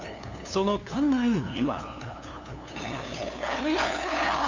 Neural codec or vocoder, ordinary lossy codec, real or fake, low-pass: codec, 16 kHz, 2 kbps, FunCodec, trained on LibriTTS, 25 frames a second; AAC, 48 kbps; fake; 7.2 kHz